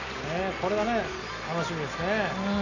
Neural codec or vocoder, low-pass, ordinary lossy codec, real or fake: none; 7.2 kHz; none; real